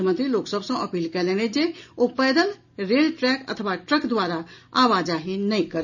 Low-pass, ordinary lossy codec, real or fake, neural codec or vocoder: 7.2 kHz; none; real; none